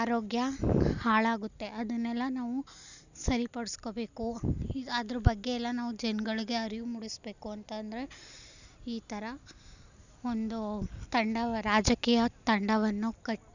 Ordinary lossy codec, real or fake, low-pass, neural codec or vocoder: none; real; 7.2 kHz; none